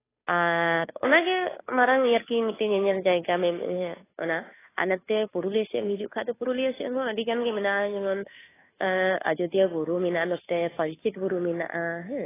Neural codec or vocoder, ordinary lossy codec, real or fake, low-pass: codec, 16 kHz, 2 kbps, FunCodec, trained on Chinese and English, 25 frames a second; AAC, 16 kbps; fake; 3.6 kHz